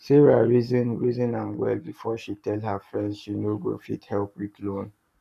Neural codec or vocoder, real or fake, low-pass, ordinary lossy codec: codec, 44.1 kHz, 7.8 kbps, Pupu-Codec; fake; 14.4 kHz; none